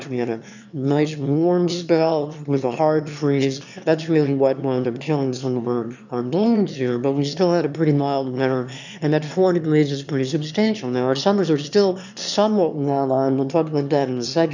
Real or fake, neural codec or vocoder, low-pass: fake; autoencoder, 22.05 kHz, a latent of 192 numbers a frame, VITS, trained on one speaker; 7.2 kHz